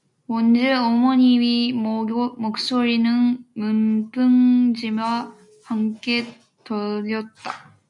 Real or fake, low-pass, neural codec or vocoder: real; 10.8 kHz; none